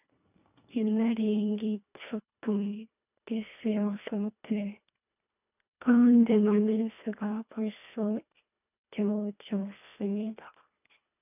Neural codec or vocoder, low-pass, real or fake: codec, 24 kHz, 1.5 kbps, HILCodec; 3.6 kHz; fake